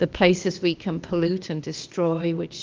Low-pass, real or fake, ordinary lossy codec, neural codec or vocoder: 7.2 kHz; fake; Opus, 32 kbps; codec, 16 kHz, 0.8 kbps, ZipCodec